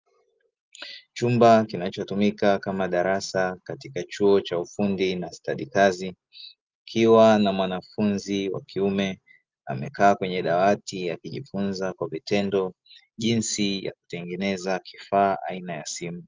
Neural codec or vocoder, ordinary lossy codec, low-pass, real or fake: none; Opus, 32 kbps; 7.2 kHz; real